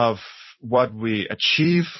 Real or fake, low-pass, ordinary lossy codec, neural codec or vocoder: fake; 7.2 kHz; MP3, 24 kbps; vocoder, 44.1 kHz, 128 mel bands every 256 samples, BigVGAN v2